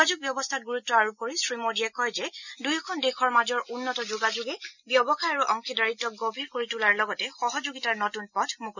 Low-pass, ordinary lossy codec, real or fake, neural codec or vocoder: 7.2 kHz; none; real; none